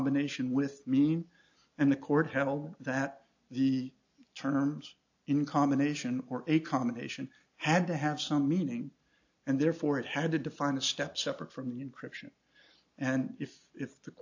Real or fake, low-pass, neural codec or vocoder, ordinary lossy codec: real; 7.2 kHz; none; AAC, 48 kbps